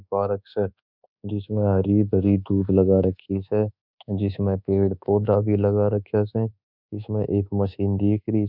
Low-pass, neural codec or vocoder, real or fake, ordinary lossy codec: 5.4 kHz; codec, 24 kHz, 1.2 kbps, DualCodec; fake; none